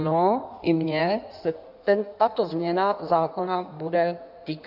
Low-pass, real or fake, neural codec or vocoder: 5.4 kHz; fake; codec, 16 kHz in and 24 kHz out, 1.1 kbps, FireRedTTS-2 codec